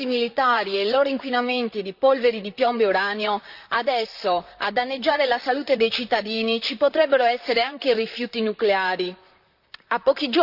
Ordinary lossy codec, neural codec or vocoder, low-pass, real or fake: Opus, 64 kbps; vocoder, 44.1 kHz, 128 mel bands, Pupu-Vocoder; 5.4 kHz; fake